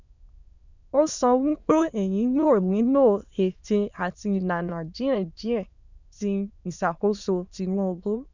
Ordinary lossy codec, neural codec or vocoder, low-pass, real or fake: none; autoencoder, 22.05 kHz, a latent of 192 numbers a frame, VITS, trained on many speakers; 7.2 kHz; fake